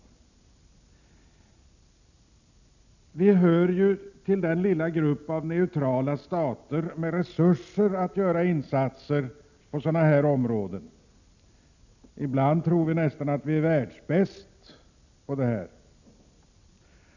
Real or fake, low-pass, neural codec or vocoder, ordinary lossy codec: real; 7.2 kHz; none; none